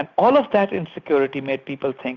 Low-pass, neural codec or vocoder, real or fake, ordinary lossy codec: 7.2 kHz; none; real; Opus, 64 kbps